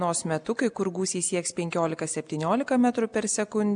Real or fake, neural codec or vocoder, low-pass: real; none; 9.9 kHz